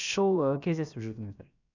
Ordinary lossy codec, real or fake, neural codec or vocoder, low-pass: none; fake; codec, 16 kHz, about 1 kbps, DyCAST, with the encoder's durations; 7.2 kHz